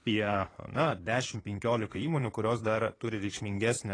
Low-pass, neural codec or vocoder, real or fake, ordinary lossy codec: 9.9 kHz; codec, 16 kHz in and 24 kHz out, 2.2 kbps, FireRedTTS-2 codec; fake; AAC, 32 kbps